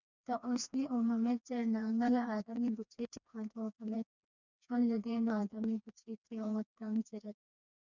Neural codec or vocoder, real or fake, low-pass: codec, 16 kHz, 2 kbps, FreqCodec, smaller model; fake; 7.2 kHz